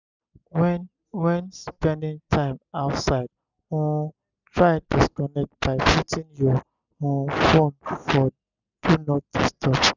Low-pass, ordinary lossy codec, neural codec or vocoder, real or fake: 7.2 kHz; none; none; real